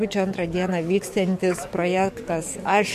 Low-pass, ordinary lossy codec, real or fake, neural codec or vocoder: 14.4 kHz; MP3, 64 kbps; fake; codec, 44.1 kHz, 7.8 kbps, DAC